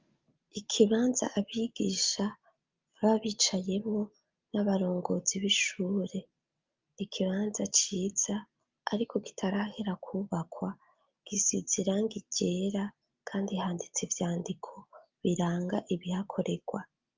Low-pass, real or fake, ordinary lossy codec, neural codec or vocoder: 7.2 kHz; real; Opus, 24 kbps; none